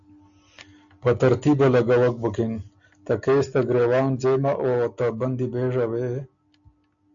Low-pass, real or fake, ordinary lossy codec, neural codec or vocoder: 7.2 kHz; real; AAC, 64 kbps; none